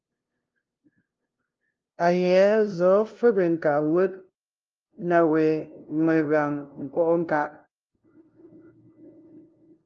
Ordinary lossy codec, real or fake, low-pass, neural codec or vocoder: Opus, 24 kbps; fake; 7.2 kHz; codec, 16 kHz, 0.5 kbps, FunCodec, trained on LibriTTS, 25 frames a second